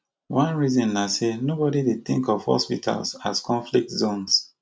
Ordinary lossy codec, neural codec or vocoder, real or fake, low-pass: none; none; real; none